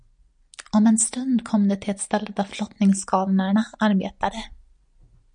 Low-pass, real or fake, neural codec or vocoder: 9.9 kHz; real; none